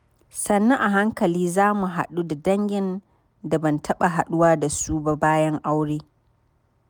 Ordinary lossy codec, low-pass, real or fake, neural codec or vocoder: none; none; real; none